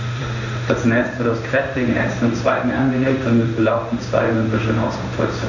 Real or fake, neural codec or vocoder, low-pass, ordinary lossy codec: fake; codec, 16 kHz in and 24 kHz out, 1 kbps, XY-Tokenizer; 7.2 kHz; none